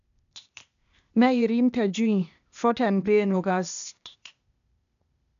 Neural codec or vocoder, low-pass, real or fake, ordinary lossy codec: codec, 16 kHz, 0.8 kbps, ZipCodec; 7.2 kHz; fake; none